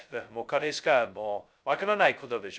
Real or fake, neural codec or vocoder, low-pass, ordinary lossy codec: fake; codec, 16 kHz, 0.2 kbps, FocalCodec; none; none